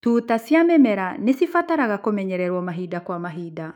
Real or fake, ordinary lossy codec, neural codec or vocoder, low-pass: fake; none; autoencoder, 48 kHz, 128 numbers a frame, DAC-VAE, trained on Japanese speech; 19.8 kHz